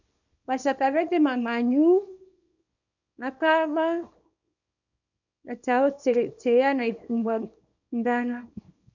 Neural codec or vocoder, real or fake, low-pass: codec, 24 kHz, 0.9 kbps, WavTokenizer, small release; fake; 7.2 kHz